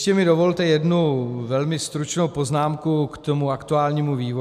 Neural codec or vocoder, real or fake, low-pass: none; real; 14.4 kHz